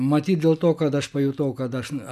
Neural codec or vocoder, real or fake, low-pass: none; real; 14.4 kHz